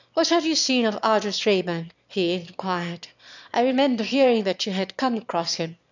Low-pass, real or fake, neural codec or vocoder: 7.2 kHz; fake; autoencoder, 22.05 kHz, a latent of 192 numbers a frame, VITS, trained on one speaker